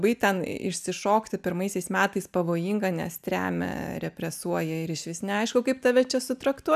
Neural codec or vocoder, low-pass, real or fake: none; 14.4 kHz; real